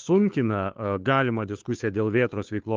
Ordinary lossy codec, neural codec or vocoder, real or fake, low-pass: Opus, 32 kbps; codec, 16 kHz, 2 kbps, FunCodec, trained on Chinese and English, 25 frames a second; fake; 7.2 kHz